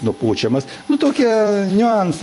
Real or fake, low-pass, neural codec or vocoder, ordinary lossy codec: fake; 14.4 kHz; vocoder, 44.1 kHz, 128 mel bands every 256 samples, BigVGAN v2; MP3, 48 kbps